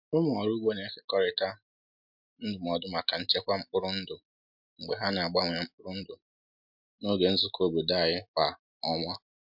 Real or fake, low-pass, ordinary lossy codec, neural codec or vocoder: real; 5.4 kHz; MP3, 48 kbps; none